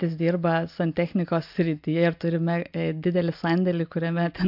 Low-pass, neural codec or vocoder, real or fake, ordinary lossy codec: 5.4 kHz; none; real; MP3, 48 kbps